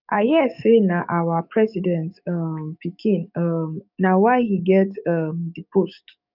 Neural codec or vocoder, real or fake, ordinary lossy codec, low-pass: codec, 44.1 kHz, 7.8 kbps, DAC; fake; none; 5.4 kHz